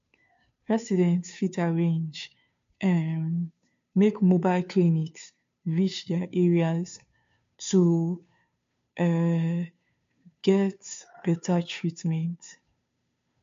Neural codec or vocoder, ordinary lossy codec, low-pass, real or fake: codec, 16 kHz, 2 kbps, FunCodec, trained on Chinese and English, 25 frames a second; MP3, 48 kbps; 7.2 kHz; fake